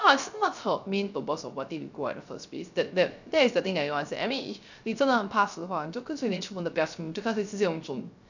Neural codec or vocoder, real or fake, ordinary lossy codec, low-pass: codec, 16 kHz, 0.3 kbps, FocalCodec; fake; none; 7.2 kHz